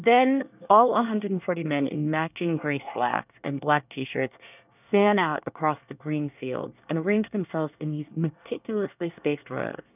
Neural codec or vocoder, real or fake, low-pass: codec, 24 kHz, 1 kbps, SNAC; fake; 3.6 kHz